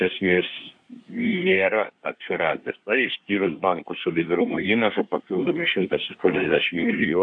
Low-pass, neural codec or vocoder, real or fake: 9.9 kHz; codec, 24 kHz, 1 kbps, SNAC; fake